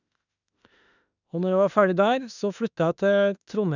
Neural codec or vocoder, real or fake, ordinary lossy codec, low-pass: autoencoder, 48 kHz, 32 numbers a frame, DAC-VAE, trained on Japanese speech; fake; none; 7.2 kHz